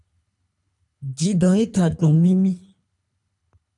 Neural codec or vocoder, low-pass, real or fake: codec, 24 kHz, 3 kbps, HILCodec; 10.8 kHz; fake